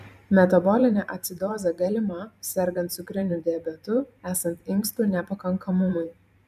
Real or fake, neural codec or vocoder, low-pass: fake; vocoder, 44.1 kHz, 128 mel bands every 512 samples, BigVGAN v2; 14.4 kHz